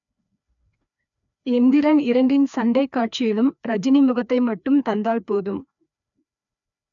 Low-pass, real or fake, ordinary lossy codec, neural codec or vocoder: 7.2 kHz; fake; none; codec, 16 kHz, 2 kbps, FreqCodec, larger model